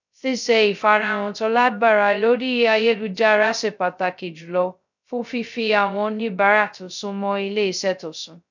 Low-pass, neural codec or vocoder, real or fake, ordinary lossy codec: 7.2 kHz; codec, 16 kHz, 0.2 kbps, FocalCodec; fake; none